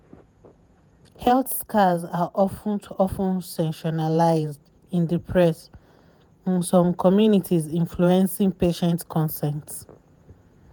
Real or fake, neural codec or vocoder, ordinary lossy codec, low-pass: fake; vocoder, 48 kHz, 128 mel bands, Vocos; none; none